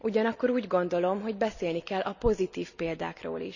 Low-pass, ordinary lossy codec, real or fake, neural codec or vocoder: 7.2 kHz; none; real; none